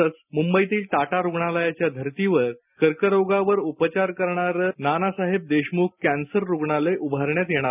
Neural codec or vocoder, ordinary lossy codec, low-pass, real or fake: none; none; 3.6 kHz; real